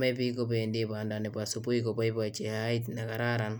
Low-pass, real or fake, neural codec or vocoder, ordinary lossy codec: none; real; none; none